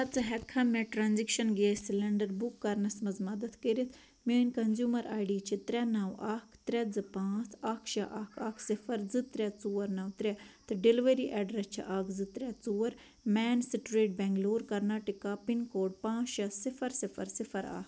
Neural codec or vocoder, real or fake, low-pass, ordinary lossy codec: none; real; none; none